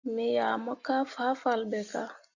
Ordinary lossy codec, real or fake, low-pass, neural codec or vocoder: Opus, 64 kbps; real; 7.2 kHz; none